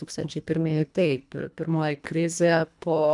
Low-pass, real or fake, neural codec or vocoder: 10.8 kHz; fake; codec, 24 kHz, 1.5 kbps, HILCodec